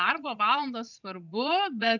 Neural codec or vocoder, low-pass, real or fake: vocoder, 22.05 kHz, 80 mel bands, Vocos; 7.2 kHz; fake